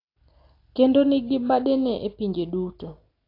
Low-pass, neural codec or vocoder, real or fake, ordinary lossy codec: 5.4 kHz; none; real; AAC, 32 kbps